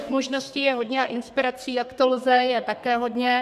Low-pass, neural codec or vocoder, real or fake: 14.4 kHz; codec, 32 kHz, 1.9 kbps, SNAC; fake